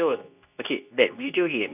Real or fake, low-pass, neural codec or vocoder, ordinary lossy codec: fake; 3.6 kHz; codec, 24 kHz, 0.9 kbps, WavTokenizer, medium speech release version 2; none